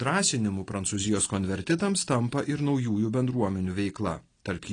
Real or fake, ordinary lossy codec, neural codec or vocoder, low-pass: real; AAC, 32 kbps; none; 9.9 kHz